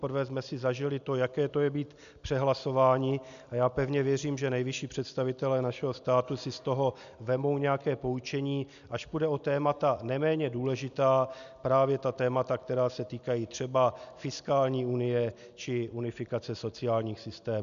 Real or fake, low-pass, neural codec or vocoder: real; 7.2 kHz; none